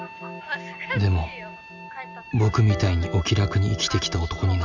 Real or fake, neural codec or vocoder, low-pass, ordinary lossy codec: real; none; 7.2 kHz; none